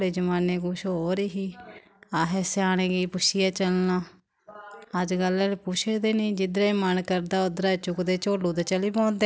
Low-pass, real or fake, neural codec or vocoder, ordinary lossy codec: none; real; none; none